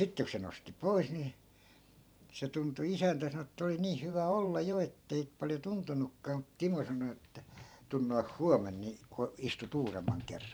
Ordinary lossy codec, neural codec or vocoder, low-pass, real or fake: none; vocoder, 44.1 kHz, 128 mel bands every 512 samples, BigVGAN v2; none; fake